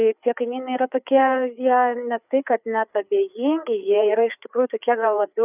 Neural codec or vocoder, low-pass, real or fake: codec, 16 kHz, 4 kbps, FreqCodec, larger model; 3.6 kHz; fake